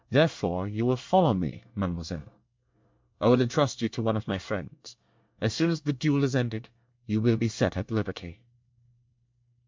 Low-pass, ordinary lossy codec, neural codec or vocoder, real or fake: 7.2 kHz; MP3, 64 kbps; codec, 24 kHz, 1 kbps, SNAC; fake